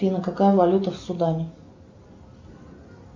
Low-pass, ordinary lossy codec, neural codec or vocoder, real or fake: 7.2 kHz; MP3, 48 kbps; none; real